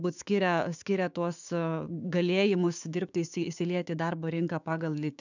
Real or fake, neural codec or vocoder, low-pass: fake; codec, 16 kHz, 6 kbps, DAC; 7.2 kHz